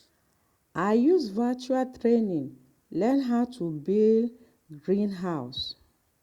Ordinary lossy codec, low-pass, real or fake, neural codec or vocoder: Opus, 64 kbps; 19.8 kHz; real; none